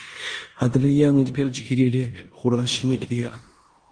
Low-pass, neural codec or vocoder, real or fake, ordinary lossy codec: 9.9 kHz; codec, 16 kHz in and 24 kHz out, 0.9 kbps, LongCat-Audio-Codec, four codebook decoder; fake; Opus, 24 kbps